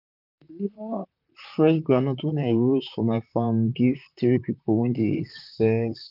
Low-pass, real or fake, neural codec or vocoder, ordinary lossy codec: 5.4 kHz; fake; vocoder, 44.1 kHz, 128 mel bands, Pupu-Vocoder; none